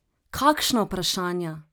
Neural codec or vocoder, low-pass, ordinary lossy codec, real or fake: none; none; none; real